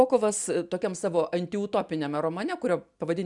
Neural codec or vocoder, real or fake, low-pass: none; real; 10.8 kHz